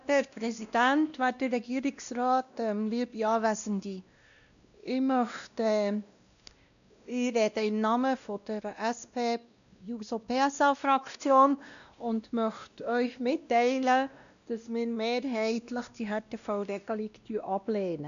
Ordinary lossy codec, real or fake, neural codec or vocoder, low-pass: none; fake; codec, 16 kHz, 1 kbps, X-Codec, WavLM features, trained on Multilingual LibriSpeech; 7.2 kHz